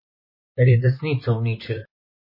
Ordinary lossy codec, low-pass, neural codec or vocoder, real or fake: MP3, 24 kbps; 5.4 kHz; vocoder, 44.1 kHz, 128 mel bands, Pupu-Vocoder; fake